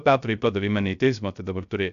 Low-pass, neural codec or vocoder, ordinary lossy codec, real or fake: 7.2 kHz; codec, 16 kHz, 0.3 kbps, FocalCodec; AAC, 96 kbps; fake